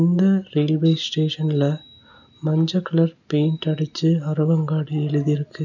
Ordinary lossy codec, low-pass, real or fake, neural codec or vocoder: none; 7.2 kHz; real; none